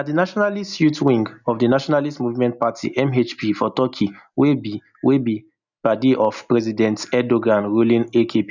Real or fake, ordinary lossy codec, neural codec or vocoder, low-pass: real; none; none; 7.2 kHz